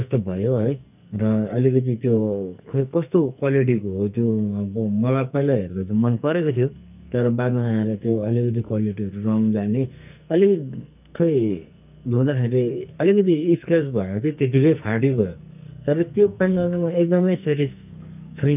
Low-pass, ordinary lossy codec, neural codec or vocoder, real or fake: 3.6 kHz; none; codec, 44.1 kHz, 2.6 kbps, SNAC; fake